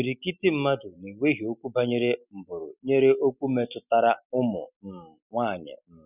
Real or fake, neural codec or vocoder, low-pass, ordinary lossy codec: real; none; 3.6 kHz; none